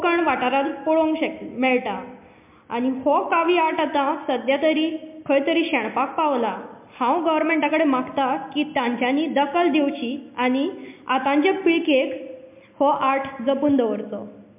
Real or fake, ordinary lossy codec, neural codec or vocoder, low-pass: real; MP3, 32 kbps; none; 3.6 kHz